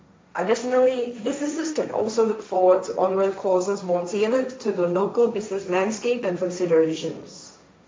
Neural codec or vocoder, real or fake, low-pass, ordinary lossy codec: codec, 16 kHz, 1.1 kbps, Voila-Tokenizer; fake; none; none